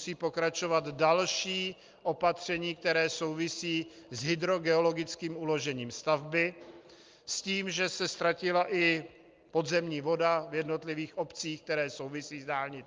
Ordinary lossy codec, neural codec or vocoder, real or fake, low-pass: Opus, 32 kbps; none; real; 7.2 kHz